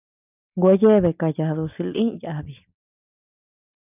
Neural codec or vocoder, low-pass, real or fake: none; 3.6 kHz; real